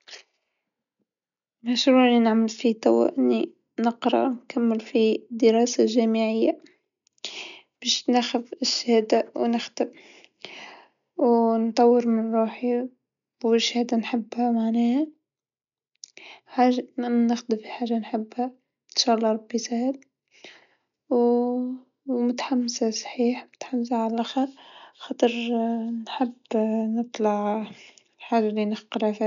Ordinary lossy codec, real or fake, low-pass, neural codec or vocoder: none; real; 7.2 kHz; none